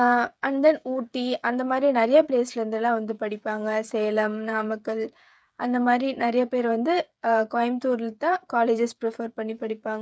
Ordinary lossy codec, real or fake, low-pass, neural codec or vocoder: none; fake; none; codec, 16 kHz, 8 kbps, FreqCodec, smaller model